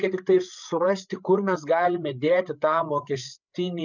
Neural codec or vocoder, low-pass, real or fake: codec, 16 kHz, 8 kbps, FreqCodec, larger model; 7.2 kHz; fake